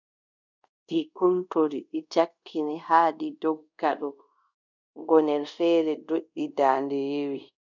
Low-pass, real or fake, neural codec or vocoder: 7.2 kHz; fake; codec, 24 kHz, 0.5 kbps, DualCodec